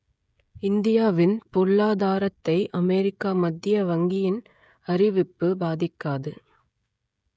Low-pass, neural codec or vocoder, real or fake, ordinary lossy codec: none; codec, 16 kHz, 16 kbps, FreqCodec, smaller model; fake; none